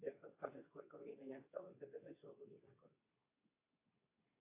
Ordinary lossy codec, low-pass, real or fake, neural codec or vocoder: AAC, 32 kbps; 3.6 kHz; fake; codec, 24 kHz, 0.9 kbps, WavTokenizer, medium speech release version 2